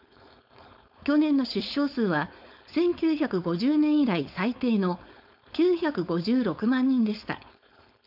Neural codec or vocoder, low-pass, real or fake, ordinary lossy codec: codec, 16 kHz, 4.8 kbps, FACodec; 5.4 kHz; fake; none